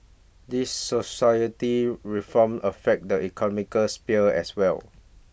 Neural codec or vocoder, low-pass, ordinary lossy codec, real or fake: none; none; none; real